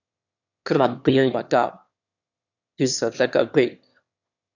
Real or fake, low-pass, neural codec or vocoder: fake; 7.2 kHz; autoencoder, 22.05 kHz, a latent of 192 numbers a frame, VITS, trained on one speaker